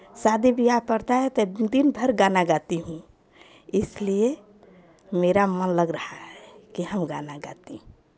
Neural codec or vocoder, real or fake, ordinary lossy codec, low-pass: none; real; none; none